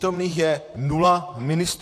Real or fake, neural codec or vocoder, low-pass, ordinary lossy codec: fake; vocoder, 44.1 kHz, 128 mel bands, Pupu-Vocoder; 14.4 kHz; AAC, 64 kbps